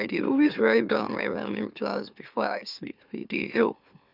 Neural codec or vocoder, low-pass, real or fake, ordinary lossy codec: autoencoder, 44.1 kHz, a latent of 192 numbers a frame, MeloTTS; 5.4 kHz; fake; none